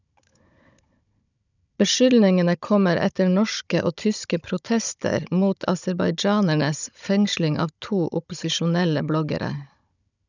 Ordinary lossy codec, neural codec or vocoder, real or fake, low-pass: none; codec, 16 kHz, 16 kbps, FunCodec, trained on Chinese and English, 50 frames a second; fake; 7.2 kHz